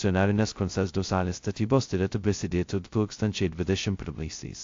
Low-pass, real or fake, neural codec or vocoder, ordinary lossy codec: 7.2 kHz; fake; codec, 16 kHz, 0.2 kbps, FocalCodec; AAC, 48 kbps